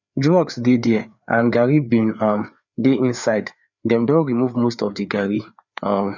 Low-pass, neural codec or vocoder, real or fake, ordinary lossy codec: 7.2 kHz; codec, 16 kHz, 4 kbps, FreqCodec, larger model; fake; none